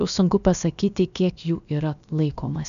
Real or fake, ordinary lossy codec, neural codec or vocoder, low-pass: fake; MP3, 96 kbps; codec, 16 kHz, about 1 kbps, DyCAST, with the encoder's durations; 7.2 kHz